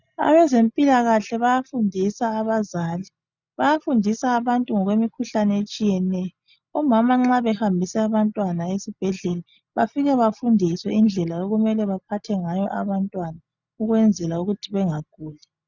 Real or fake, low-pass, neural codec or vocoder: real; 7.2 kHz; none